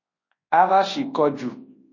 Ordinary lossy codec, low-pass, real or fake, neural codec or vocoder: MP3, 32 kbps; 7.2 kHz; fake; codec, 24 kHz, 0.9 kbps, WavTokenizer, large speech release